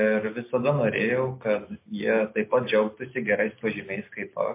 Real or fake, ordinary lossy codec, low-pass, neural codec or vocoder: real; MP3, 24 kbps; 3.6 kHz; none